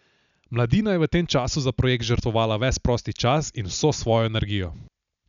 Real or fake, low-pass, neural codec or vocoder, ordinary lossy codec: real; 7.2 kHz; none; none